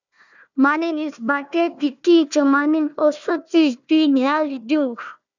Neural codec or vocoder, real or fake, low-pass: codec, 16 kHz, 1 kbps, FunCodec, trained on Chinese and English, 50 frames a second; fake; 7.2 kHz